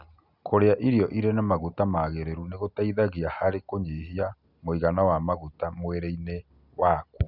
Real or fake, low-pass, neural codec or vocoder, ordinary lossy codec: real; 5.4 kHz; none; none